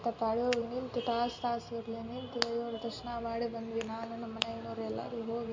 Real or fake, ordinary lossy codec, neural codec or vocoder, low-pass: real; MP3, 48 kbps; none; 7.2 kHz